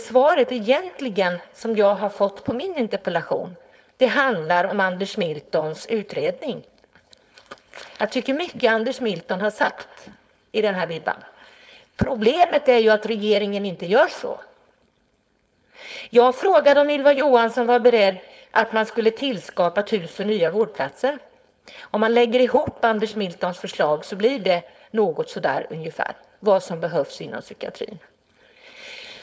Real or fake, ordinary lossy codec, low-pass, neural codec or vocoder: fake; none; none; codec, 16 kHz, 4.8 kbps, FACodec